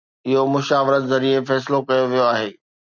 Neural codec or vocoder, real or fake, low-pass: none; real; 7.2 kHz